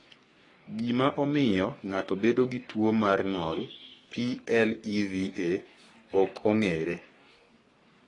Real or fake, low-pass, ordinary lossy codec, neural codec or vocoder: fake; 10.8 kHz; AAC, 32 kbps; codec, 44.1 kHz, 3.4 kbps, Pupu-Codec